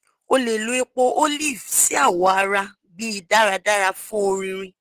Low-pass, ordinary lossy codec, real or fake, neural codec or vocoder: 14.4 kHz; Opus, 16 kbps; real; none